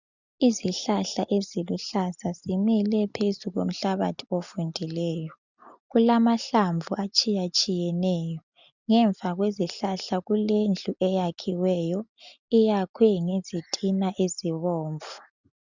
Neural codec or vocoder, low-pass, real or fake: none; 7.2 kHz; real